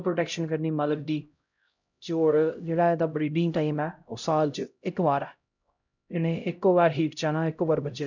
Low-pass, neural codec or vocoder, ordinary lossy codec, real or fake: 7.2 kHz; codec, 16 kHz, 0.5 kbps, X-Codec, HuBERT features, trained on LibriSpeech; none; fake